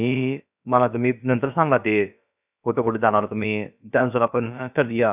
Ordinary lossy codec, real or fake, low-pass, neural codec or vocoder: none; fake; 3.6 kHz; codec, 16 kHz, about 1 kbps, DyCAST, with the encoder's durations